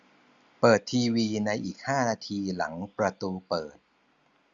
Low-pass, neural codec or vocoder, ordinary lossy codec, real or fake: 7.2 kHz; none; Opus, 64 kbps; real